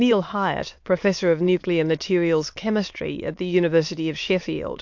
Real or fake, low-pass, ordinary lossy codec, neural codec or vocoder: fake; 7.2 kHz; MP3, 48 kbps; autoencoder, 22.05 kHz, a latent of 192 numbers a frame, VITS, trained on many speakers